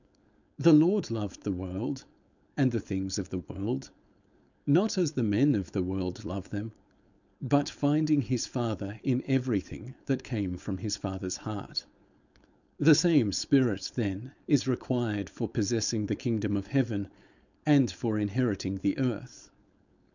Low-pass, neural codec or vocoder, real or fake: 7.2 kHz; codec, 16 kHz, 4.8 kbps, FACodec; fake